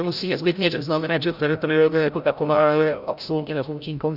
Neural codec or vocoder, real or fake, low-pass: codec, 16 kHz, 0.5 kbps, FreqCodec, larger model; fake; 5.4 kHz